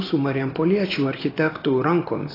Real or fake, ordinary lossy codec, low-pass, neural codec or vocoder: real; AAC, 24 kbps; 5.4 kHz; none